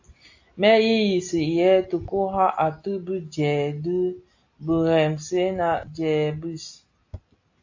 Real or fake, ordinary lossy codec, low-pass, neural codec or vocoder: real; AAC, 48 kbps; 7.2 kHz; none